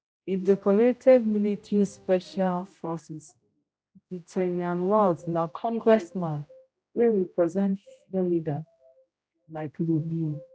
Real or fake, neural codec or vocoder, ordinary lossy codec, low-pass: fake; codec, 16 kHz, 0.5 kbps, X-Codec, HuBERT features, trained on general audio; none; none